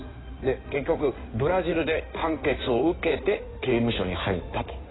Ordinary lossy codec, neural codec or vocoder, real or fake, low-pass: AAC, 16 kbps; codec, 16 kHz in and 24 kHz out, 2.2 kbps, FireRedTTS-2 codec; fake; 7.2 kHz